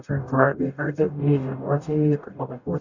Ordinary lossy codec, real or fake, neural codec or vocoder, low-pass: none; fake; codec, 44.1 kHz, 0.9 kbps, DAC; 7.2 kHz